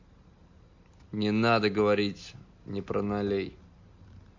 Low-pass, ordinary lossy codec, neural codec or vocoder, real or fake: 7.2 kHz; MP3, 48 kbps; none; real